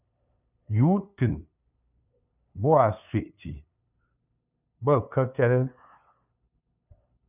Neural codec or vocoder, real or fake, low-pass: codec, 16 kHz, 2 kbps, FunCodec, trained on LibriTTS, 25 frames a second; fake; 3.6 kHz